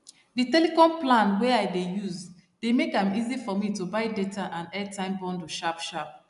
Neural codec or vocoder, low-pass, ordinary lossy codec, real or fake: none; 10.8 kHz; MP3, 96 kbps; real